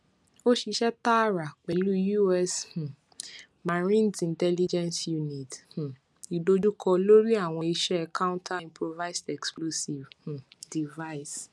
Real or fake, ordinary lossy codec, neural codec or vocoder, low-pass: real; none; none; none